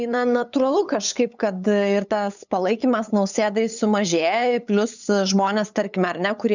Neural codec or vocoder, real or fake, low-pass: codec, 16 kHz, 8 kbps, FunCodec, trained on LibriTTS, 25 frames a second; fake; 7.2 kHz